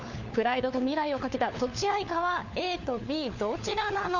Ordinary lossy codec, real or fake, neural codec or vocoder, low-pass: none; fake; codec, 16 kHz, 4 kbps, FunCodec, trained on LibriTTS, 50 frames a second; 7.2 kHz